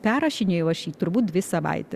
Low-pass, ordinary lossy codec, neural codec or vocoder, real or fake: 14.4 kHz; Opus, 64 kbps; none; real